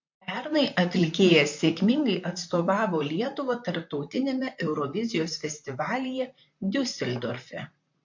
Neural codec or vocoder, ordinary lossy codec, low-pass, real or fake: vocoder, 44.1 kHz, 128 mel bands, Pupu-Vocoder; MP3, 48 kbps; 7.2 kHz; fake